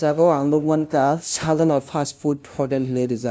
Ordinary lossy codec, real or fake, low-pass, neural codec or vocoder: none; fake; none; codec, 16 kHz, 0.5 kbps, FunCodec, trained on LibriTTS, 25 frames a second